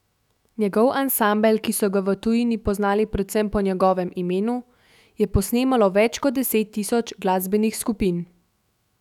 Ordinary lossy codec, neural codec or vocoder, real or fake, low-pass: none; autoencoder, 48 kHz, 128 numbers a frame, DAC-VAE, trained on Japanese speech; fake; 19.8 kHz